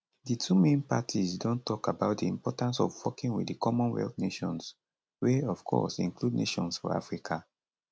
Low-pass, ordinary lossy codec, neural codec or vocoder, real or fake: none; none; none; real